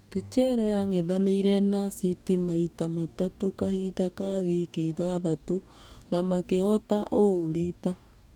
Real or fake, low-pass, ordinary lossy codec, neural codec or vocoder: fake; 19.8 kHz; none; codec, 44.1 kHz, 2.6 kbps, DAC